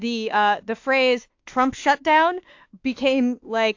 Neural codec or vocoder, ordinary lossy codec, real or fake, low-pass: autoencoder, 48 kHz, 32 numbers a frame, DAC-VAE, trained on Japanese speech; AAC, 48 kbps; fake; 7.2 kHz